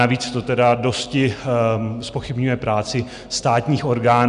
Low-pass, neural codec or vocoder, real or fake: 10.8 kHz; none; real